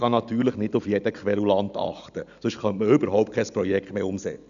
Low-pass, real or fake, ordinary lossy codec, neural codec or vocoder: 7.2 kHz; real; none; none